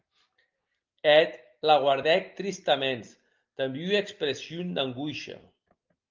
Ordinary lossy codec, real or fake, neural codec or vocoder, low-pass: Opus, 32 kbps; real; none; 7.2 kHz